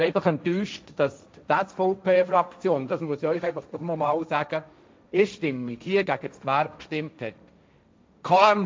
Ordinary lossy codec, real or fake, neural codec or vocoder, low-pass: none; fake; codec, 16 kHz, 1.1 kbps, Voila-Tokenizer; none